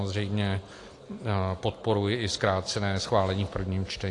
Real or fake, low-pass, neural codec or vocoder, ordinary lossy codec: real; 10.8 kHz; none; AAC, 48 kbps